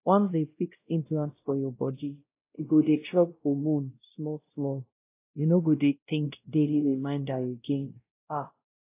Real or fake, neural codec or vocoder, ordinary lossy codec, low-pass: fake; codec, 16 kHz, 0.5 kbps, X-Codec, WavLM features, trained on Multilingual LibriSpeech; AAC, 24 kbps; 3.6 kHz